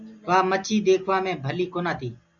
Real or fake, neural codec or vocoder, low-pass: real; none; 7.2 kHz